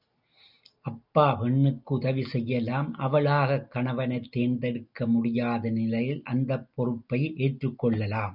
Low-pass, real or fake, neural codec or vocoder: 5.4 kHz; real; none